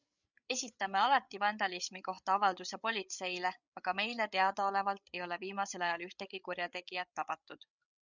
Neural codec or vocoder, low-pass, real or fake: codec, 16 kHz, 8 kbps, FreqCodec, larger model; 7.2 kHz; fake